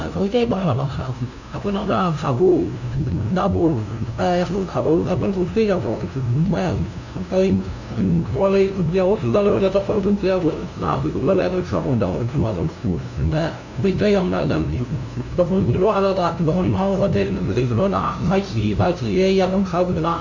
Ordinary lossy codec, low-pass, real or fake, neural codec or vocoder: none; 7.2 kHz; fake; codec, 16 kHz, 0.5 kbps, FunCodec, trained on LibriTTS, 25 frames a second